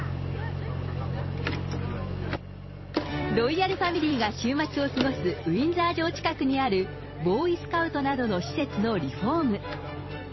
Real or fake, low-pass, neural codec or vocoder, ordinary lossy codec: fake; 7.2 kHz; vocoder, 44.1 kHz, 128 mel bands every 256 samples, BigVGAN v2; MP3, 24 kbps